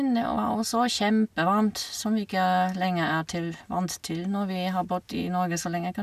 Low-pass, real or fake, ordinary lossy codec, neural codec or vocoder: 14.4 kHz; real; none; none